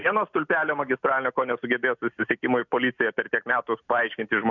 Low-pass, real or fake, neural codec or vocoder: 7.2 kHz; real; none